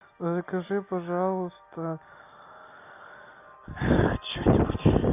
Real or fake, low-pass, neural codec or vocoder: real; 3.6 kHz; none